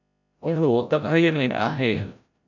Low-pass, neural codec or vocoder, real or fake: 7.2 kHz; codec, 16 kHz, 0.5 kbps, FreqCodec, larger model; fake